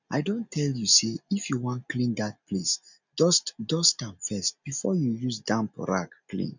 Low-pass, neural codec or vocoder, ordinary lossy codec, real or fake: 7.2 kHz; none; none; real